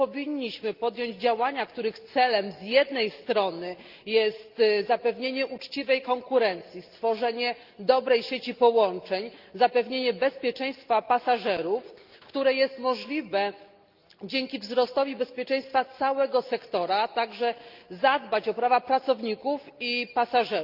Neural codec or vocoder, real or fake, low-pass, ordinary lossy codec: none; real; 5.4 kHz; Opus, 24 kbps